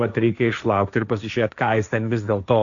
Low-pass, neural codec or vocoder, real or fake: 7.2 kHz; codec, 16 kHz, 1.1 kbps, Voila-Tokenizer; fake